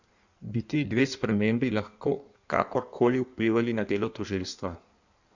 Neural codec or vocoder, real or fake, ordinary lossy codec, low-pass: codec, 16 kHz in and 24 kHz out, 1.1 kbps, FireRedTTS-2 codec; fake; none; 7.2 kHz